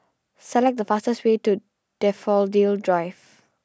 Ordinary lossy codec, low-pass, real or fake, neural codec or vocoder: none; none; real; none